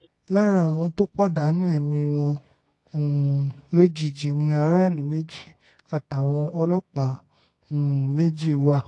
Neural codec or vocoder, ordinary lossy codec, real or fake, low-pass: codec, 24 kHz, 0.9 kbps, WavTokenizer, medium music audio release; AAC, 48 kbps; fake; 10.8 kHz